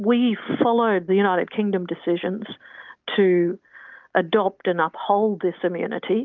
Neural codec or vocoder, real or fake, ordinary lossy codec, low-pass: none; real; Opus, 32 kbps; 7.2 kHz